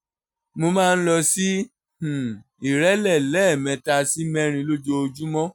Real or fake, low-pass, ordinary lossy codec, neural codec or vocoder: real; 19.8 kHz; none; none